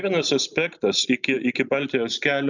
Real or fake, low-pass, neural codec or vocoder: real; 7.2 kHz; none